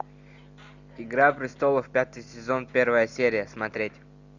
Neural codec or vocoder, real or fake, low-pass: none; real; 7.2 kHz